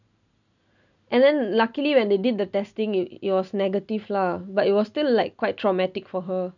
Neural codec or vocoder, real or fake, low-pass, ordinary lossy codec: none; real; 7.2 kHz; none